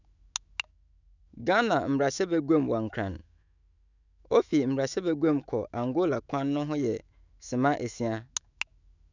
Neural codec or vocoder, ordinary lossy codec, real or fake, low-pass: autoencoder, 48 kHz, 128 numbers a frame, DAC-VAE, trained on Japanese speech; none; fake; 7.2 kHz